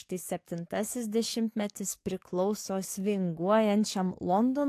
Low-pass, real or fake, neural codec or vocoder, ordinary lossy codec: 14.4 kHz; fake; codec, 44.1 kHz, 7.8 kbps, DAC; AAC, 64 kbps